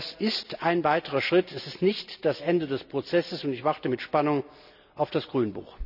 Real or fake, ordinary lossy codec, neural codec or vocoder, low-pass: real; none; none; 5.4 kHz